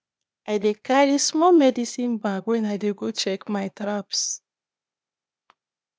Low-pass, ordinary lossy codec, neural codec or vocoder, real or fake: none; none; codec, 16 kHz, 0.8 kbps, ZipCodec; fake